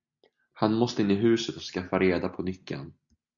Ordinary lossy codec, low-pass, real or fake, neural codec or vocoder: MP3, 96 kbps; 7.2 kHz; real; none